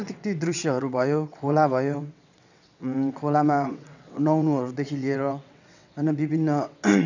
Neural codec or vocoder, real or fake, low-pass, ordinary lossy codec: vocoder, 22.05 kHz, 80 mel bands, WaveNeXt; fake; 7.2 kHz; none